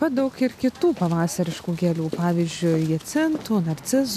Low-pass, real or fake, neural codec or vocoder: 14.4 kHz; real; none